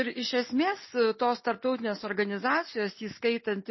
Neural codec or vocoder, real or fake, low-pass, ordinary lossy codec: none; real; 7.2 kHz; MP3, 24 kbps